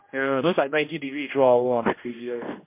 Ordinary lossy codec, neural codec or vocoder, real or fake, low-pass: MP3, 32 kbps; codec, 16 kHz, 1 kbps, X-Codec, HuBERT features, trained on general audio; fake; 3.6 kHz